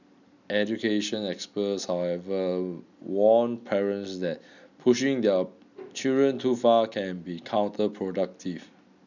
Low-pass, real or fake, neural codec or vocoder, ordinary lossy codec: 7.2 kHz; real; none; none